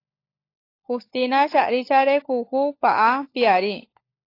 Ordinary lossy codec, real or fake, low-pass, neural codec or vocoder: AAC, 32 kbps; fake; 5.4 kHz; codec, 16 kHz, 16 kbps, FunCodec, trained on LibriTTS, 50 frames a second